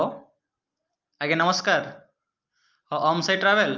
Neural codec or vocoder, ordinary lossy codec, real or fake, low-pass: none; Opus, 24 kbps; real; 7.2 kHz